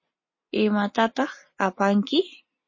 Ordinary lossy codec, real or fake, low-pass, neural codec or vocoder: MP3, 32 kbps; real; 7.2 kHz; none